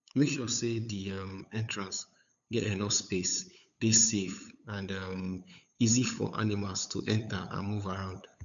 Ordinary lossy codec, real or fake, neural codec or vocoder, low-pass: MP3, 96 kbps; fake; codec, 16 kHz, 8 kbps, FunCodec, trained on LibriTTS, 25 frames a second; 7.2 kHz